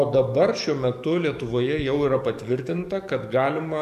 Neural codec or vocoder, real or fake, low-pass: codec, 44.1 kHz, 7.8 kbps, DAC; fake; 14.4 kHz